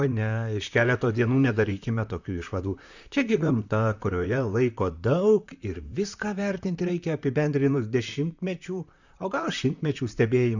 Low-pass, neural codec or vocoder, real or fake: 7.2 kHz; vocoder, 44.1 kHz, 128 mel bands, Pupu-Vocoder; fake